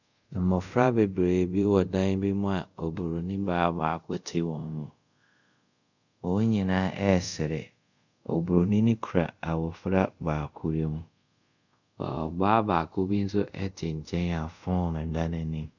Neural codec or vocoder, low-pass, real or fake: codec, 24 kHz, 0.5 kbps, DualCodec; 7.2 kHz; fake